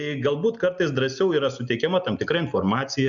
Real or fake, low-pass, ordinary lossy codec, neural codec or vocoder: real; 7.2 kHz; MP3, 96 kbps; none